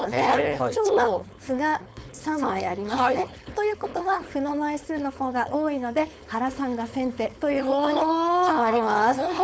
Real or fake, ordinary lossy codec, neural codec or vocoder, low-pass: fake; none; codec, 16 kHz, 4.8 kbps, FACodec; none